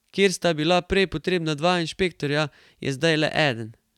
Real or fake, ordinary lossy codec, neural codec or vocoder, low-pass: real; none; none; 19.8 kHz